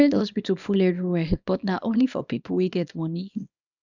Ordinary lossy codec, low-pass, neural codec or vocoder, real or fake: none; 7.2 kHz; codec, 24 kHz, 0.9 kbps, WavTokenizer, small release; fake